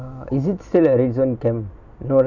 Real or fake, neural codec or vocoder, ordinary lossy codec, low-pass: real; none; none; 7.2 kHz